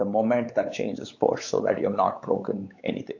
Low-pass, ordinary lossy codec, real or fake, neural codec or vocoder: 7.2 kHz; AAC, 48 kbps; fake; codec, 16 kHz, 4 kbps, X-Codec, WavLM features, trained on Multilingual LibriSpeech